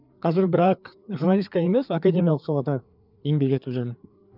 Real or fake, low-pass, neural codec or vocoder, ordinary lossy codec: fake; 5.4 kHz; codec, 16 kHz, 4 kbps, FreqCodec, larger model; none